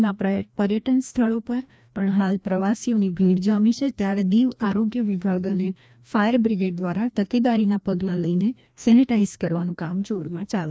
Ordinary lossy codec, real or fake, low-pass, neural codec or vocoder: none; fake; none; codec, 16 kHz, 1 kbps, FreqCodec, larger model